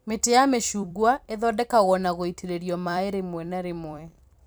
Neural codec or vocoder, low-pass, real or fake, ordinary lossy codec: vocoder, 44.1 kHz, 128 mel bands every 256 samples, BigVGAN v2; none; fake; none